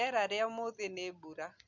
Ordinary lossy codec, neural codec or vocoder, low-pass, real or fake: none; none; 7.2 kHz; real